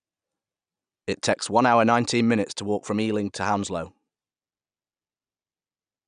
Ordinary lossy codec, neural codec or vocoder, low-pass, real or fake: none; none; 9.9 kHz; real